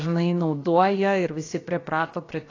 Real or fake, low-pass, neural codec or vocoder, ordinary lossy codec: fake; 7.2 kHz; codec, 16 kHz, about 1 kbps, DyCAST, with the encoder's durations; AAC, 32 kbps